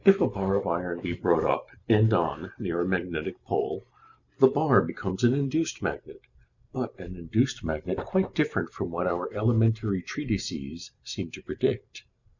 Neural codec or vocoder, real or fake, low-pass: vocoder, 44.1 kHz, 128 mel bands every 512 samples, BigVGAN v2; fake; 7.2 kHz